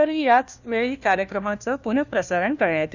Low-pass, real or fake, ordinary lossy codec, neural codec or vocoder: 7.2 kHz; fake; none; codec, 16 kHz, 1 kbps, FunCodec, trained on LibriTTS, 50 frames a second